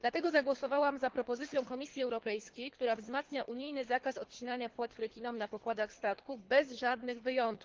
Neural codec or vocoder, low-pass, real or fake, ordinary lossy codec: codec, 24 kHz, 3 kbps, HILCodec; 7.2 kHz; fake; Opus, 16 kbps